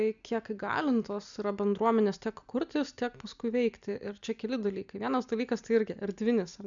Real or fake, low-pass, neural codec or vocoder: real; 7.2 kHz; none